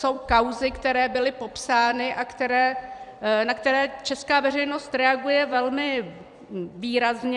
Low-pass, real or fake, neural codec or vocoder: 10.8 kHz; fake; vocoder, 44.1 kHz, 128 mel bands every 256 samples, BigVGAN v2